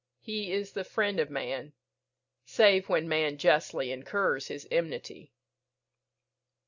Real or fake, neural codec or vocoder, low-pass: real; none; 7.2 kHz